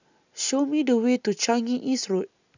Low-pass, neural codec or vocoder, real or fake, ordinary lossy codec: 7.2 kHz; none; real; none